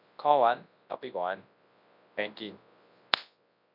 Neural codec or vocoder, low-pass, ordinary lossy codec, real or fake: codec, 24 kHz, 0.9 kbps, WavTokenizer, large speech release; 5.4 kHz; none; fake